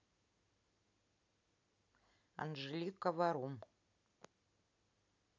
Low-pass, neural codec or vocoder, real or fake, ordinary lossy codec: 7.2 kHz; none; real; none